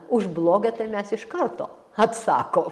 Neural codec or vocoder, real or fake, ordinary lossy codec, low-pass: none; real; Opus, 24 kbps; 14.4 kHz